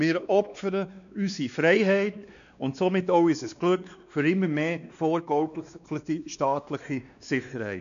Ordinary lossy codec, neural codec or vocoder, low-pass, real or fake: none; codec, 16 kHz, 2 kbps, X-Codec, WavLM features, trained on Multilingual LibriSpeech; 7.2 kHz; fake